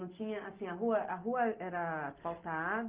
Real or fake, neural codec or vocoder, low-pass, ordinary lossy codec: real; none; 3.6 kHz; Opus, 24 kbps